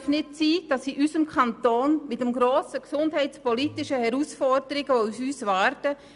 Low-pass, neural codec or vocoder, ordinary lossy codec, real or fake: 10.8 kHz; none; none; real